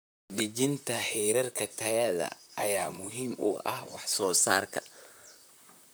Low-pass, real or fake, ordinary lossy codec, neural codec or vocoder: none; fake; none; vocoder, 44.1 kHz, 128 mel bands, Pupu-Vocoder